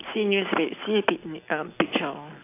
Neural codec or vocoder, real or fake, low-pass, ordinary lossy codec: none; real; 3.6 kHz; AAC, 24 kbps